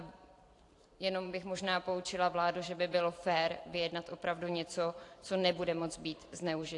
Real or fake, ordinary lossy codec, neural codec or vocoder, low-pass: fake; AAC, 48 kbps; vocoder, 44.1 kHz, 128 mel bands every 512 samples, BigVGAN v2; 10.8 kHz